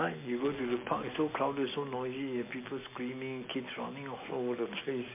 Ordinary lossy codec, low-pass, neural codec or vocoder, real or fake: MP3, 24 kbps; 3.6 kHz; none; real